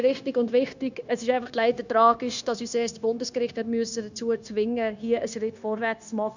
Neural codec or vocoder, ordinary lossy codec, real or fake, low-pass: codec, 16 kHz, 0.9 kbps, LongCat-Audio-Codec; none; fake; 7.2 kHz